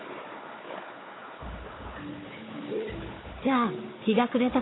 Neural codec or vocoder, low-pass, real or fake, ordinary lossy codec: codec, 16 kHz, 4 kbps, FunCodec, trained on Chinese and English, 50 frames a second; 7.2 kHz; fake; AAC, 16 kbps